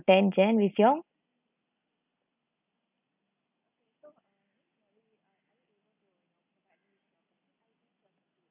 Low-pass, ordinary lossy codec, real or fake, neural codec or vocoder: 3.6 kHz; none; real; none